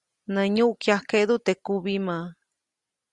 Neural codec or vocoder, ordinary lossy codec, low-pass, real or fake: none; Opus, 64 kbps; 10.8 kHz; real